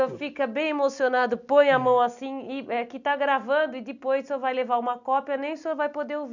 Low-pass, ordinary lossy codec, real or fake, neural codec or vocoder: 7.2 kHz; none; real; none